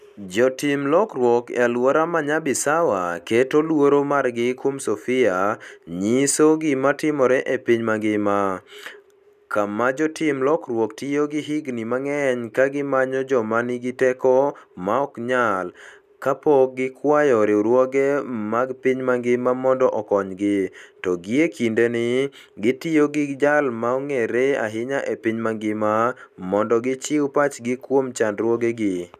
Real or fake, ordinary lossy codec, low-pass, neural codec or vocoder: real; none; 14.4 kHz; none